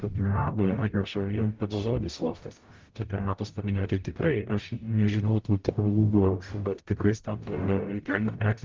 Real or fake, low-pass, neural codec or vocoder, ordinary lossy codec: fake; 7.2 kHz; codec, 44.1 kHz, 0.9 kbps, DAC; Opus, 32 kbps